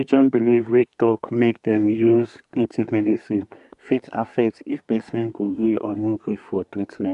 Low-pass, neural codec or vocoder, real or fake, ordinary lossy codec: 10.8 kHz; codec, 24 kHz, 1 kbps, SNAC; fake; none